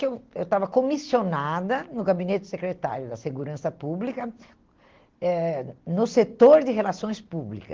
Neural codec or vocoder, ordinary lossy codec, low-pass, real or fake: none; Opus, 16 kbps; 7.2 kHz; real